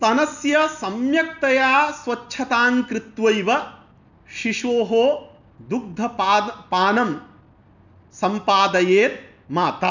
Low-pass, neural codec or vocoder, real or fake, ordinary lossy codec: 7.2 kHz; none; real; none